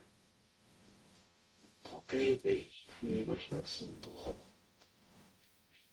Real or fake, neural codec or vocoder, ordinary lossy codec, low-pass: fake; codec, 44.1 kHz, 0.9 kbps, DAC; Opus, 32 kbps; 19.8 kHz